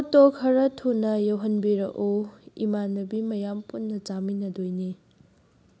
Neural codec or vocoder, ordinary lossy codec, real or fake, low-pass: none; none; real; none